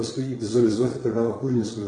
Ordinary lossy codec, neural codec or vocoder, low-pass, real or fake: AAC, 32 kbps; vocoder, 22.05 kHz, 80 mel bands, Vocos; 9.9 kHz; fake